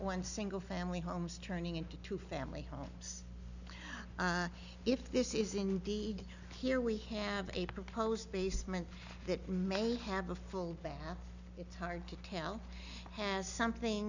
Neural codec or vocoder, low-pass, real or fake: none; 7.2 kHz; real